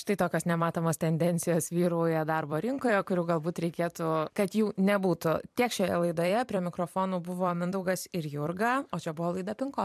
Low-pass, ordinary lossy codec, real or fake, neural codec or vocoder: 14.4 kHz; MP3, 96 kbps; fake; vocoder, 44.1 kHz, 128 mel bands every 256 samples, BigVGAN v2